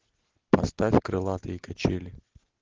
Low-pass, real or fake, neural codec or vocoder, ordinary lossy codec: 7.2 kHz; real; none; Opus, 16 kbps